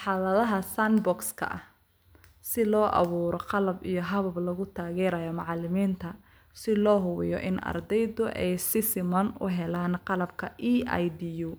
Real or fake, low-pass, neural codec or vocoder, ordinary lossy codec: real; none; none; none